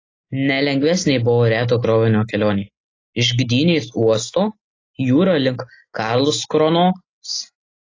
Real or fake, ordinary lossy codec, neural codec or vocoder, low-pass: real; AAC, 32 kbps; none; 7.2 kHz